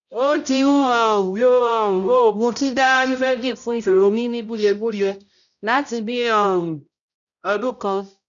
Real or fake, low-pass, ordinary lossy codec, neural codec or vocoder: fake; 7.2 kHz; none; codec, 16 kHz, 0.5 kbps, X-Codec, HuBERT features, trained on balanced general audio